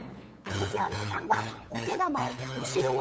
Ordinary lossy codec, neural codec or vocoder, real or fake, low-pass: none; codec, 16 kHz, 8 kbps, FunCodec, trained on LibriTTS, 25 frames a second; fake; none